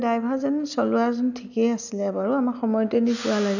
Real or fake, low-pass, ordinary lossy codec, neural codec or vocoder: real; 7.2 kHz; none; none